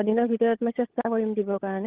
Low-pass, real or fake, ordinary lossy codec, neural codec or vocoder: 3.6 kHz; fake; Opus, 16 kbps; codec, 16 kHz, 16 kbps, FunCodec, trained on LibriTTS, 50 frames a second